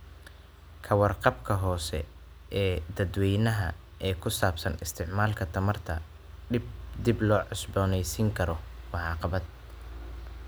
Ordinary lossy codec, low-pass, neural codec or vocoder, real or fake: none; none; none; real